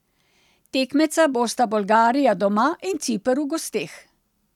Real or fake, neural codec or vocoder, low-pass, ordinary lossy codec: real; none; 19.8 kHz; none